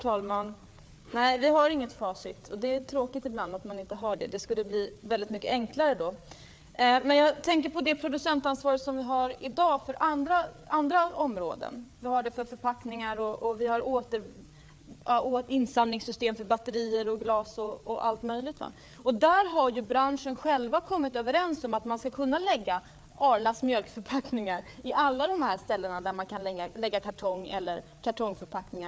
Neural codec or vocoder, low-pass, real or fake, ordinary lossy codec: codec, 16 kHz, 4 kbps, FreqCodec, larger model; none; fake; none